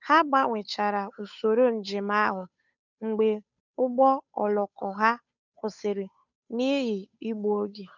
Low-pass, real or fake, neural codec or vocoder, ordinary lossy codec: 7.2 kHz; fake; codec, 16 kHz, 8 kbps, FunCodec, trained on Chinese and English, 25 frames a second; none